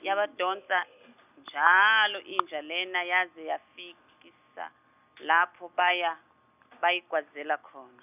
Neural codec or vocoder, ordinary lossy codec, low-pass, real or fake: none; none; 3.6 kHz; real